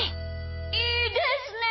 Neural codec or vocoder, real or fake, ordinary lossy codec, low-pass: none; real; MP3, 24 kbps; 7.2 kHz